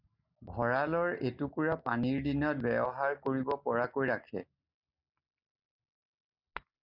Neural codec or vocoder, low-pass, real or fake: none; 5.4 kHz; real